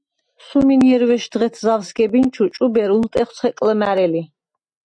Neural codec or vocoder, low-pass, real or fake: none; 9.9 kHz; real